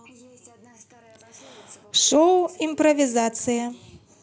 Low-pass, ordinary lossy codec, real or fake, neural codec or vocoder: none; none; real; none